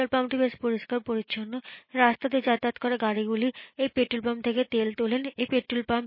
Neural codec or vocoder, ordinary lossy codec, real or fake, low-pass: none; MP3, 24 kbps; real; 5.4 kHz